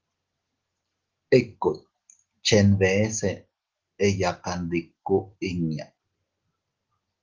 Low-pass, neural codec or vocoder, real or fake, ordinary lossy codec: 7.2 kHz; none; real; Opus, 16 kbps